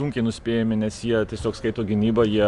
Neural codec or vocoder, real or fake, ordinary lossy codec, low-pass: none; real; Opus, 64 kbps; 10.8 kHz